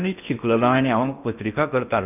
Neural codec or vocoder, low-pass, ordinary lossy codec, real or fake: codec, 16 kHz in and 24 kHz out, 0.8 kbps, FocalCodec, streaming, 65536 codes; 3.6 kHz; none; fake